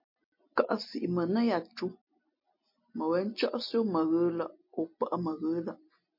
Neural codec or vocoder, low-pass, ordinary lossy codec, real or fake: none; 5.4 kHz; MP3, 32 kbps; real